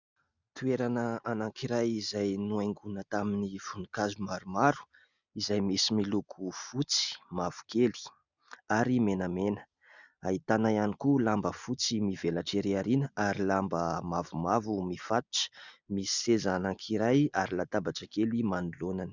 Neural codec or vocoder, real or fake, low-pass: none; real; 7.2 kHz